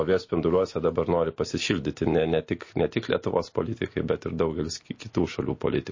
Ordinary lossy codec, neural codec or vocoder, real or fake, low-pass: MP3, 32 kbps; none; real; 7.2 kHz